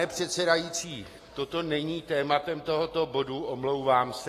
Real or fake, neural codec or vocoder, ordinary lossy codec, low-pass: real; none; AAC, 48 kbps; 14.4 kHz